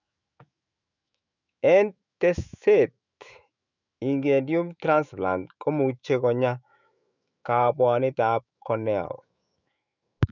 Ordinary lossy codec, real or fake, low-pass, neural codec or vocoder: none; fake; 7.2 kHz; autoencoder, 48 kHz, 128 numbers a frame, DAC-VAE, trained on Japanese speech